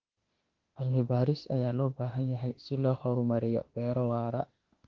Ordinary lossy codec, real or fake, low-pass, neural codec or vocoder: Opus, 16 kbps; fake; 7.2 kHz; autoencoder, 48 kHz, 32 numbers a frame, DAC-VAE, trained on Japanese speech